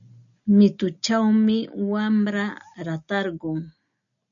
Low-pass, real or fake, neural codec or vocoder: 7.2 kHz; real; none